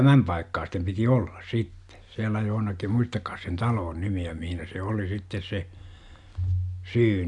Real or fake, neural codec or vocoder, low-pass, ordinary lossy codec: real; none; 10.8 kHz; none